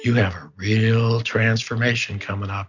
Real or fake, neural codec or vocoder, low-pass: real; none; 7.2 kHz